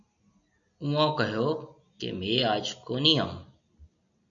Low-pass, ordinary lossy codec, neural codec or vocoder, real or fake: 7.2 kHz; MP3, 64 kbps; none; real